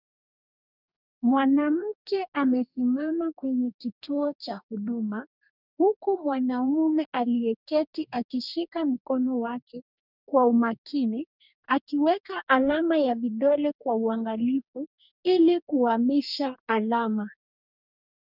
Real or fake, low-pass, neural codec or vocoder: fake; 5.4 kHz; codec, 44.1 kHz, 2.6 kbps, DAC